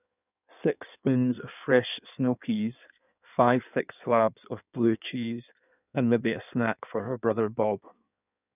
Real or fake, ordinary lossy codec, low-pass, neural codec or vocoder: fake; none; 3.6 kHz; codec, 16 kHz in and 24 kHz out, 1.1 kbps, FireRedTTS-2 codec